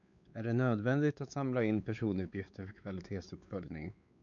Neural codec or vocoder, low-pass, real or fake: codec, 16 kHz, 4 kbps, X-Codec, WavLM features, trained on Multilingual LibriSpeech; 7.2 kHz; fake